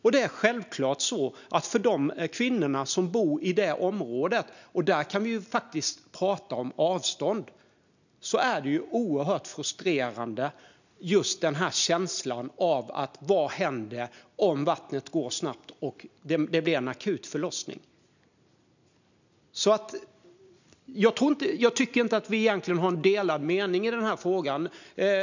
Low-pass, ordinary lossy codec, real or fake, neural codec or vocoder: 7.2 kHz; none; real; none